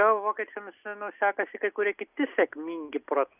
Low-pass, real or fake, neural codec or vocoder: 3.6 kHz; real; none